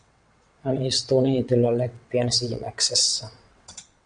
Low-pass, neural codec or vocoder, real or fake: 9.9 kHz; vocoder, 22.05 kHz, 80 mel bands, WaveNeXt; fake